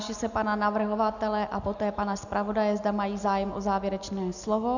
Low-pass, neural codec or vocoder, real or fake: 7.2 kHz; none; real